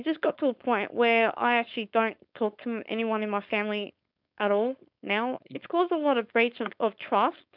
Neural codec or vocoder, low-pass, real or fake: codec, 16 kHz, 4.8 kbps, FACodec; 5.4 kHz; fake